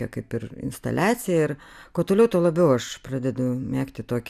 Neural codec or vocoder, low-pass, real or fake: none; 14.4 kHz; real